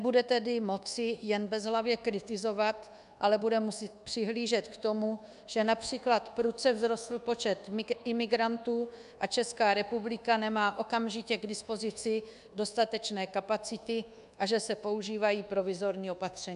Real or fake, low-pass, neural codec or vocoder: fake; 10.8 kHz; codec, 24 kHz, 1.2 kbps, DualCodec